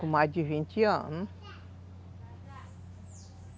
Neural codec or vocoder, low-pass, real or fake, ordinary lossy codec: none; none; real; none